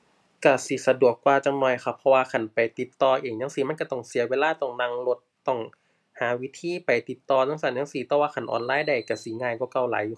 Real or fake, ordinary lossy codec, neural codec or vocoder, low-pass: real; none; none; none